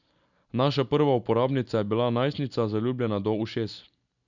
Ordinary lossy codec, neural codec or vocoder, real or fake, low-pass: none; none; real; 7.2 kHz